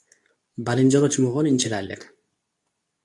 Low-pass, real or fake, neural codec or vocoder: 10.8 kHz; fake; codec, 24 kHz, 0.9 kbps, WavTokenizer, medium speech release version 2